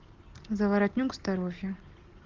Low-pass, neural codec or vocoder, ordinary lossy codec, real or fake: 7.2 kHz; none; Opus, 16 kbps; real